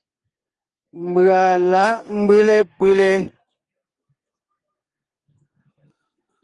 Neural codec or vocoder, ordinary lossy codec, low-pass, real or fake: codec, 32 kHz, 1.9 kbps, SNAC; Opus, 24 kbps; 10.8 kHz; fake